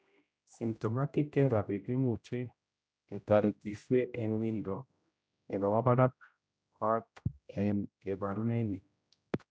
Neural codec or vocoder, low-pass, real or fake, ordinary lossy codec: codec, 16 kHz, 0.5 kbps, X-Codec, HuBERT features, trained on general audio; none; fake; none